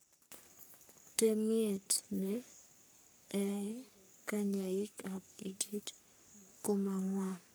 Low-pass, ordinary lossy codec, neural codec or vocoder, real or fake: none; none; codec, 44.1 kHz, 3.4 kbps, Pupu-Codec; fake